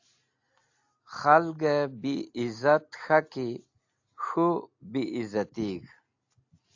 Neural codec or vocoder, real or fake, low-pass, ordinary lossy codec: none; real; 7.2 kHz; AAC, 48 kbps